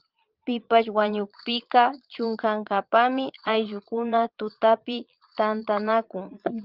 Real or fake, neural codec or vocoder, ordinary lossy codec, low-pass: fake; vocoder, 22.05 kHz, 80 mel bands, Vocos; Opus, 24 kbps; 5.4 kHz